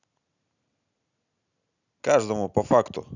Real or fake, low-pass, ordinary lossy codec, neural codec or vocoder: real; 7.2 kHz; none; none